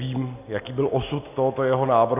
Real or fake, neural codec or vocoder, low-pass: real; none; 3.6 kHz